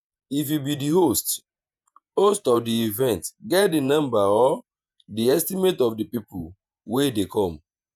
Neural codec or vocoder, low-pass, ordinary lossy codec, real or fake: vocoder, 48 kHz, 128 mel bands, Vocos; 19.8 kHz; none; fake